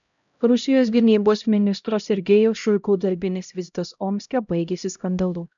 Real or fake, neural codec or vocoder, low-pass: fake; codec, 16 kHz, 0.5 kbps, X-Codec, HuBERT features, trained on LibriSpeech; 7.2 kHz